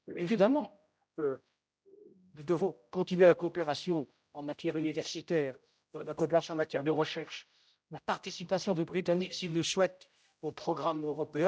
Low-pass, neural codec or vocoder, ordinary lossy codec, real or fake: none; codec, 16 kHz, 0.5 kbps, X-Codec, HuBERT features, trained on general audio; none; fake